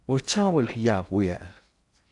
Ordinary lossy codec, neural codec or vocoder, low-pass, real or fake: MP3, 96 kbps; codec, 16 kHz in and 24 kHz out, 0.6 kbps, FocalCodec, streaming, 4096 codes; 10.8 kHz; fake